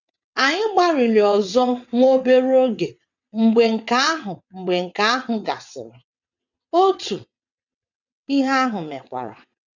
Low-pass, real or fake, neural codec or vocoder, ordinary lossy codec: 7.2 kHz; fake; vocoder, 22.05 kHz, 80 mel bands, WaveNeXt; none